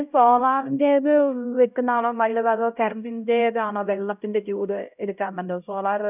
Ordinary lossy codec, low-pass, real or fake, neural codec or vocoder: none; 3.6 kHz; fake; codec, 16 kHz, 0.5 kbps, X-Codec, HuBERT features, trained on LibriSpeech